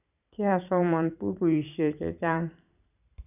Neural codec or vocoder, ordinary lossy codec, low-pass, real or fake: vocoder, 44.1 kHz, 128 mel bands every 512 samples, BigVGAN v2; none; 3.6 kHz; fake